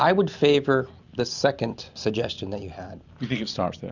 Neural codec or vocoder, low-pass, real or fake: none; 7.2 kHz; real